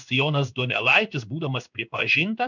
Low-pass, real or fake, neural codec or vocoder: 7.2 kHz; fake; codec, 16 kHz in and 24 kHz out, 1 kbps, XY-Tokenizer